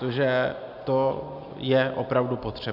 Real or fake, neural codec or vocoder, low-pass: real; none; 5.4 kHz